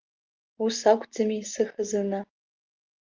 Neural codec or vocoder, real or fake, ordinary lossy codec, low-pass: none; real; Opus, 24 kbps; 7.2 kHz